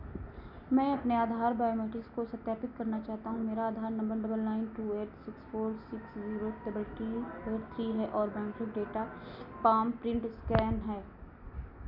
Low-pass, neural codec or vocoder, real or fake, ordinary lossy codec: 5.4 kHz; none; real; none